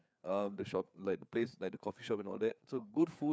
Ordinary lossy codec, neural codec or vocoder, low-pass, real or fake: none; codec, 16 kHz, 4 kbps, FreqCodec, larger model; none; fake